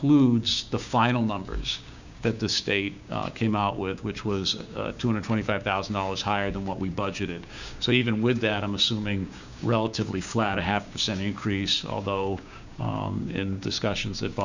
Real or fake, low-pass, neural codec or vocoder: fake; 7.2 kHz; codec, 16 kHz, 6 kbps, DAC